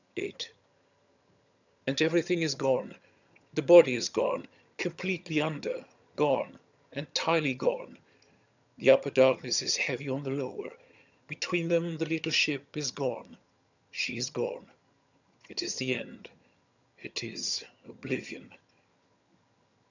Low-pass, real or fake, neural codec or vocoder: 7.2 kHz; fake; vocoder, 22.05 kHz, 80 mel bands, HiFi-GAN